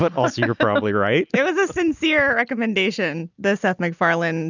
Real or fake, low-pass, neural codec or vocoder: real; 7.2 kHz; none